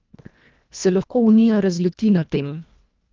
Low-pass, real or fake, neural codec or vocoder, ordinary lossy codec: 7.2 kHz; fake; codec, 24 kHz, 1.5 kbps, HILCodec; Opus, 24 kbps